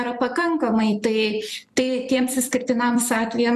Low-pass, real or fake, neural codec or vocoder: 14.4 kHz; fake; vocoder, 44.1 kHz, 128 mel bands every 256 samples, BigVGAN v2